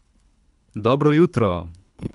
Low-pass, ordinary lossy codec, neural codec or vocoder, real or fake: 10.8 kHz; none; codec, 24 kHz, 3 kbps, HILCodec; fake